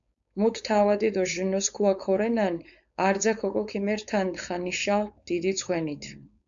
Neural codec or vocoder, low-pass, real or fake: codec, 16 kHz, 4.8 kbps, FACodec; 7.2 kHz; fake